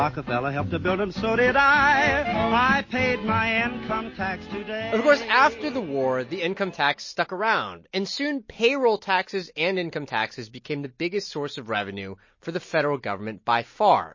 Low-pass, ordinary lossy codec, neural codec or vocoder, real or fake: 7.2 kHz; MP3, 32 kbps; none; real